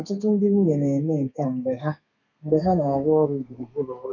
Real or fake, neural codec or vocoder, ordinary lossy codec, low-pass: fake; codec, 32 kHz, 1.9 kbps, SNAC; none; 7.2 kHz